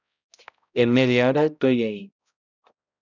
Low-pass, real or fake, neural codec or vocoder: 7.2 kHz; fake; codec, 16 kHz, 0.5 kbps, X-Codec, HuBERT features, trained on balanced general audio